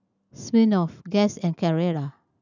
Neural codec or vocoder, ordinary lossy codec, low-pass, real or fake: none; none; 7.2 kHz; real